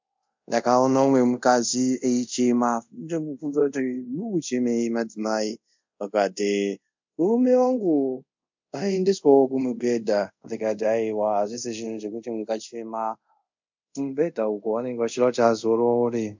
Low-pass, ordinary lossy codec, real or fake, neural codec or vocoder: 7.2 kHz; MP3, 64 kbps; fake; codec, 24 kHz, 0.5 kbps, DualCodec